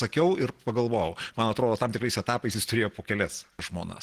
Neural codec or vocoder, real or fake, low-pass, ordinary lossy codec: none; real; 14.4 kHz; Opus, 16 kbps